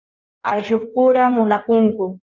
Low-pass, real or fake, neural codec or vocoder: 7.2 kHz; fake; codec, 16 kHz in and 24 kHz out, 1.1 kbps, FireRedTTS-2 codec